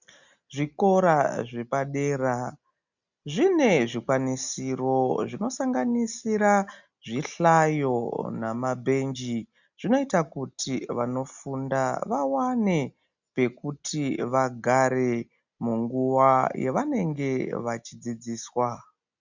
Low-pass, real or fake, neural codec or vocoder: 7.2 kHz; real; none